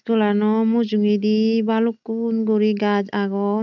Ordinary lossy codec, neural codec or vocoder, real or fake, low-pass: none; none; real; 7.2 kHz